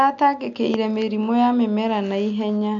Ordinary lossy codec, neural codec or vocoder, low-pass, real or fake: none; none; 7.2 kHz; real